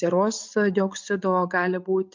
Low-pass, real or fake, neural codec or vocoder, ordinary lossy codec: 7.2 kHz; real; none; MP3, 64 kbps